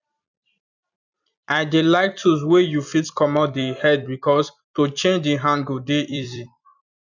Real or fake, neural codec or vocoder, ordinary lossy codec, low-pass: fake; vocoder, 44.1 kHz, 128 mel bands every 256 samples, BigVGAN v2; none; 7.2 kHz